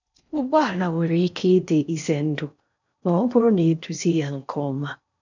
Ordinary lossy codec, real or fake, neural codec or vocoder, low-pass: none; fake; codec, 16 kHz in and 24 kHz out, 0.6 kbps, FocalCodec, streaming, 4096 codes; 7.2 kHz